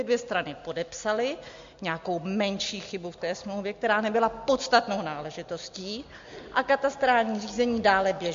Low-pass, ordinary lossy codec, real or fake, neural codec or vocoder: 7.2 kHz; MP3, 48 kbps; real; none